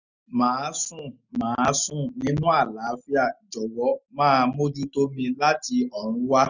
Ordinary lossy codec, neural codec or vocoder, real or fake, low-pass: none; none; real; 7.2 kHz